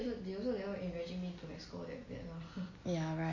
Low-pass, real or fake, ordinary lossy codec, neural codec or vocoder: 7.2 kHz; real; MP3, 32 kbps; none